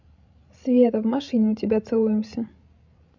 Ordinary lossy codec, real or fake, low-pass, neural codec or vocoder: MP3, 64 kbps; fake; 7.2 kHz; codec, 16 kHz, 16 kbps, FreqCodec, larger model